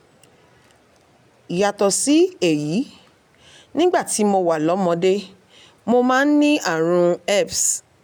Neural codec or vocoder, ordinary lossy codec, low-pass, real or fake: none; none; none; real